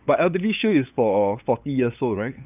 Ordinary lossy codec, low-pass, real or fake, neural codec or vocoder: none; 3.6 kHz; fake; codec, 16 kHz, 8 kbps, FunCodec, trained on LibriTTS, 25 frames a second